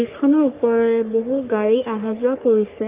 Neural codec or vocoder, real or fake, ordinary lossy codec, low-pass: codec, 44.1 kHz, 3.4 kbps, Pupu-Codec; fake; Opus, 32 kbps; 3.6 kHz